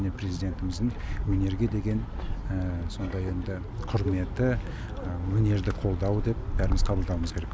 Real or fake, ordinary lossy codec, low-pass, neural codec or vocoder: real; none; none; none